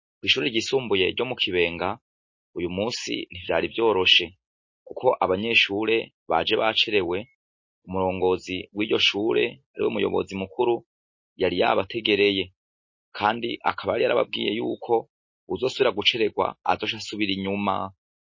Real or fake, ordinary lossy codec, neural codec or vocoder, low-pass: real; MP3, 32 kbps; none; 7.2 kHz